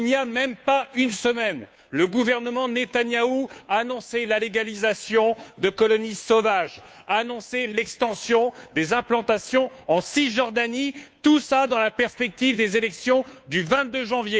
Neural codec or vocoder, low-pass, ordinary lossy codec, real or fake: codec, 16 kHz, 2 kbps, FunCodec, trained on Chinese and English, 25 frames a second; none; none; fake